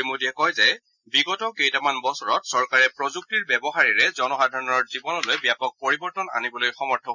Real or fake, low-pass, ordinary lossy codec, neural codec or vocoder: real; 7.2 kHz; none; none